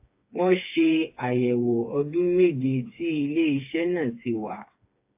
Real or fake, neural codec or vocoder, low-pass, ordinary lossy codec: fake; codec, 16 kHz, 4 kbps, FreqCodec, smaller model; 3.6 kHz; AAC, 32 kbps